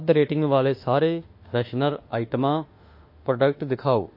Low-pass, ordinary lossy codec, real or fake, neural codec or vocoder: 5.4 kHz; MP3, 32 kbps; fake; autoencoder, 48 kHz, 32 numbers a frame, DAC-VAE, trained on Japanese speech